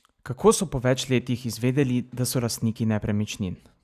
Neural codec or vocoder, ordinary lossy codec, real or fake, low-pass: none; none; real; 14.4 kHz